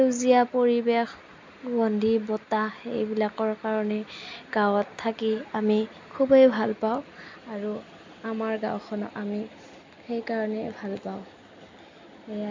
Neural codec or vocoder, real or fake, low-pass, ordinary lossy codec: none; real; 7.2 kHz; MP3, 64 kbps